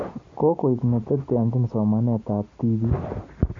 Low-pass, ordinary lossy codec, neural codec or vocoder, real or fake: 7.2 kHz; MP3, 48 kbps; none; real